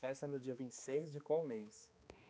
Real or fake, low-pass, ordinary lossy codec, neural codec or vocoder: fake; none; none; codec, 16 kHz, 2 kbps, X-Codec, HuBERT features, trained on balanced general audio